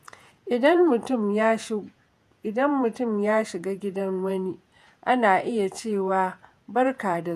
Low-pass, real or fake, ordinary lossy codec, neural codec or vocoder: 14.4 kHz; fake; none; vocoder, 48 kHz, 128 mel bands, Vocos